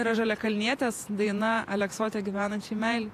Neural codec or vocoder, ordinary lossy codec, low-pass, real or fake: vocoder, 48 kHz, 128 mel bands, Vocos; AAC, 64 kbps; 14.4 kHz; fake